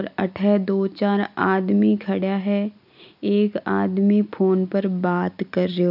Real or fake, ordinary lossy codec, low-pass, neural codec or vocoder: real; none; 5.4 kHz; none